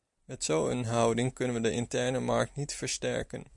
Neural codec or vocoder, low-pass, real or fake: none; 10.8 kHz; real